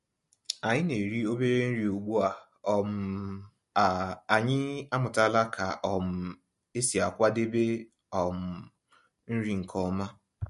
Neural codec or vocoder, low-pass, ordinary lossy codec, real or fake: none; 14.4 kHz; MP3, 48 kbps; real